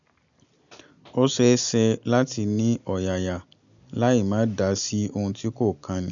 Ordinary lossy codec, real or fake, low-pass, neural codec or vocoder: none; real; 7.2 kHz; none